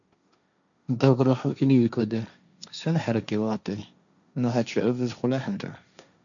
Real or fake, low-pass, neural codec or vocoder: fake; 7.2 kHz; codec, 16 kHz, 1.1 kbps, Voila-Tokenizer